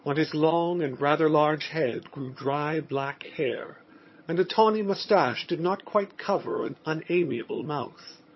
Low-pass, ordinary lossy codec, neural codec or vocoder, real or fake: 7.2 kHz; MP3, 24 kbps; vocoder, 22.05 kHz, 80 mel bands, HiFi-GAN; fake